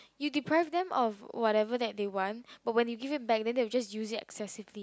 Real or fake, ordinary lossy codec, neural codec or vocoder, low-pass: real; none; none; none